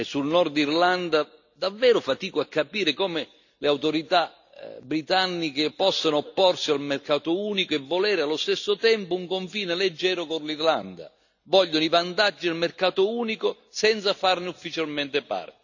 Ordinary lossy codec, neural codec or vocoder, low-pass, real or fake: none; none; 7.2 kHz; real